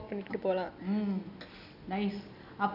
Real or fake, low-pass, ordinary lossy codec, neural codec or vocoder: real; 5.4 kHz; none; none